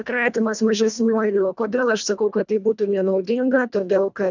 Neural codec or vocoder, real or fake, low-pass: codec, 24 kHz, 1.5 kbps, HILCodec; fake; 7.2 kHz